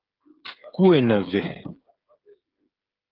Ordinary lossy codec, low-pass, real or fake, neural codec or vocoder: Opus, 32 kbps; 5.4 kHz; fake; codec, 16 kHz, 8 kbps, FreqCodec, smaller model